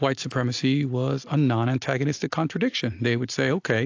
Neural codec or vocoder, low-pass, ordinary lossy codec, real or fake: none; 7.2 kHz; AAC, 48 kbps; real